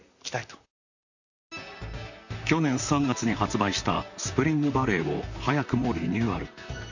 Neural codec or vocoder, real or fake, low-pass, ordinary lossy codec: vocoder, 44.1 kHz, 128 mel bands, Pupu-Vocoder; fake; 7.2 kHz; none